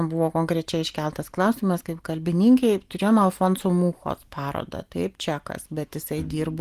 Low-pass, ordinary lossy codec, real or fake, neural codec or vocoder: 14.4 kHz; Opus, 24 kbps; real; none